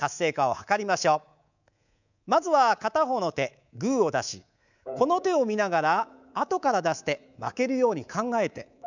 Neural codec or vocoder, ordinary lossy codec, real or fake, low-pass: codec, 24 kHz, 3.1 kbps, DualCodec; none; fake; 7.2 kHz